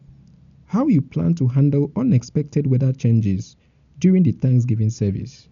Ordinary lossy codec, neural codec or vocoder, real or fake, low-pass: none; none; real; 7.2 kHz